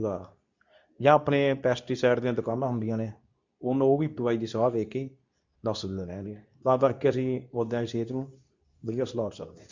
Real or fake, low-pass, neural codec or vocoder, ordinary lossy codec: fake; 7.2 kHz; codec, 24 kHz, 0.9 kbps, WavTokenizer, medium speech release version 2; Opus, 64 kbps